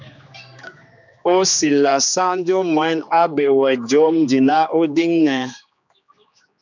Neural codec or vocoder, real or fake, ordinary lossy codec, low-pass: codec, 16 kHz, 2 kbps, X-Codec, HuBERT features, trained on general audio; fake; MP3, 64 kbps; 7.2 kHz